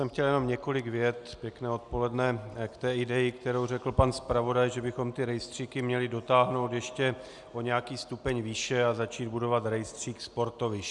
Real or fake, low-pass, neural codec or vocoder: real; 10.8 kHz; none